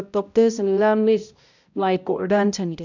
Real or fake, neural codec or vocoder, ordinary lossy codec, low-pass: fake; codec, 16 kHz, 0.5 kbps, X-Codec, HuBERT features, trained on balanced general audio; none; 7.2 kHz